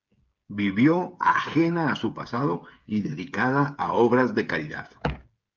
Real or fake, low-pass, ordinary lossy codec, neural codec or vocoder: fake; 7.2 kHz; Opus, 24 kbps; codec, 16 kHz, 8 kbps, FreqCodec, smaller model